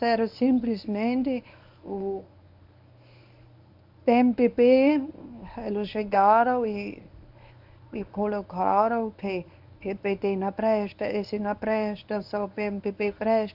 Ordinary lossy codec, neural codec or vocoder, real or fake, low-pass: AAC, 48 kbps; codec, 24 kHz, 0.9 kbps, WavTokenizer, medium speech release version 1; fake; 5.4 kHz